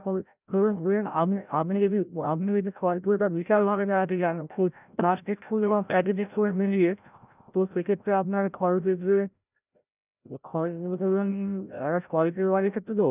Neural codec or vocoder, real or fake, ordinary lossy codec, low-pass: codec, 16 kHz, 0.5 kbps, FreqCodec, larger model; fake; none; 3.6 kHz